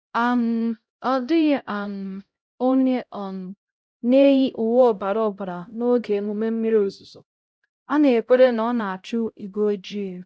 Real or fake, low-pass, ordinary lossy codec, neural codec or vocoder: fake; none; none; codec, 16 kHz, 0.5 kbps, X-Codec, HuBERT features, trained on LibriSpeech